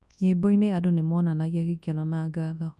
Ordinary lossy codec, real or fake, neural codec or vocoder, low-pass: none; fake; codec, 24 kHz, 0.9 kbps, WavTokenizer, large speech release; none